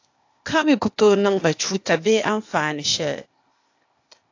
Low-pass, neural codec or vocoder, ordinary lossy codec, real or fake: 7.2 kHz; codec, 16 kHz, 0.8 kbps, ZipCodec; AAC, 48 kbps; fake